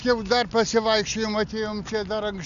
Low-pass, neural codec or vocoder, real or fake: 7.2 kHz; none; real